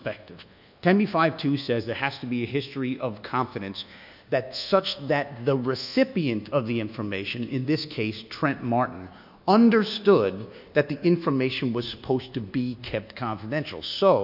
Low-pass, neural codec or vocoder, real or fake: 5.4 kHz; codec, 24 kHz, 1.2 kbps, DualCodec; fake